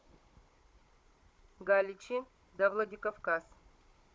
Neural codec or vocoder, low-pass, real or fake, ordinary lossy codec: codec, 16 kHz, 16 kbps, FunCodec, trained on Chinese and English, 50 frames a second; none; fake; none